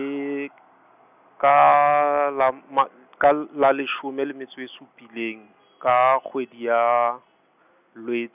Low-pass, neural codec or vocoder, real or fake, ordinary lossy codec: 3.6 kHz; none; real; none